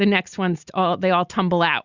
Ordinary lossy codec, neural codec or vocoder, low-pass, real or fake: Opus, 64 kbps; none; 7.2 kHz; real